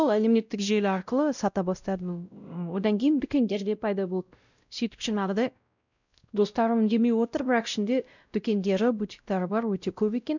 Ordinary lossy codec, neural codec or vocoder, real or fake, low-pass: none; codec, 16 kHz, 0.5 kbps, X-Codec, WavLM features, trained on Multilingual LibriSpeech; fake; 7.2 kHz